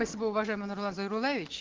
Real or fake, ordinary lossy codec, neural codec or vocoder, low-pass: real; Opus, 16 kbps; none; 7.2 kHz